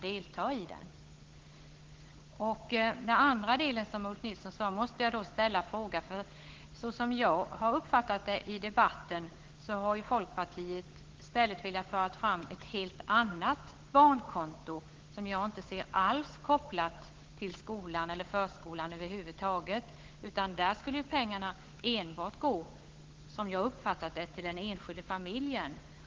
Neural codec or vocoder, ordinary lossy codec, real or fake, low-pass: codec, 16 kHz, 8 kbps, FunCodec, trained on Chinese and English, 25 frames a second; Opus, 16 kbps; fake; 7.2 kHz